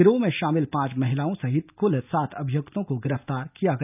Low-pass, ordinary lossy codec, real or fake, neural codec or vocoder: 3.6 kHz; none; real; none